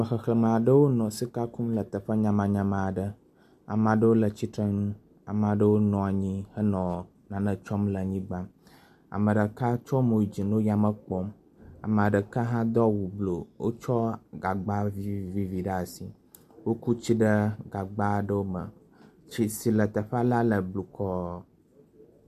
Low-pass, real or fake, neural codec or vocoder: 14.4 kHz; real; none